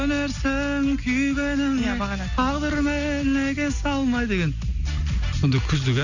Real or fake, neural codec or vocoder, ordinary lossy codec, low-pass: real; none; none; 7.2 kHz